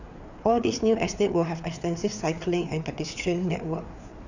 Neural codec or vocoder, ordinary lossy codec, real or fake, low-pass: codec, 16 kHz, 4 kbps, FreqCodec, larger model; none; fake; 7.2 kHz